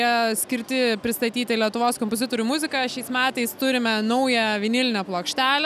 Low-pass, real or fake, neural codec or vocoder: 14.4 kHz; real; none